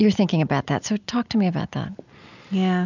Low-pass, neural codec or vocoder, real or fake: 7.2 kHz; none; real